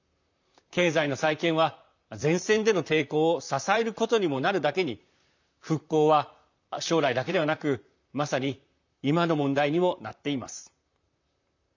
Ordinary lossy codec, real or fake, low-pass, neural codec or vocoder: none; fake; 7.2 kHz; vocoder, 44.1 kHz, 128 mel bands, Pupu-Vocoder